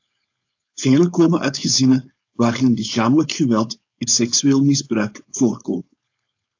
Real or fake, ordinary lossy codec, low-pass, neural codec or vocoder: fake; AAC, 48 kbps; 7.2 kHz; codec, 16 kHz, 4.8 kbps, FACodec